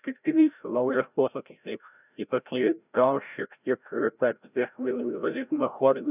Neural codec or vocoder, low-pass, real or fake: codec, 16 kHz, 0.5 kbps, FreqCodec, larger model; 3.6 kHz; fake